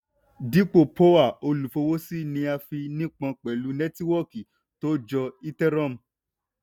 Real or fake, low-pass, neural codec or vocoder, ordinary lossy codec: real; 19.8 kHz; none; none